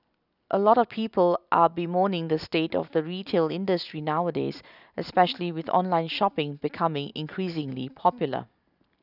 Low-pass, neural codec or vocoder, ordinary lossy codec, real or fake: 5.4 kHz; none; none; real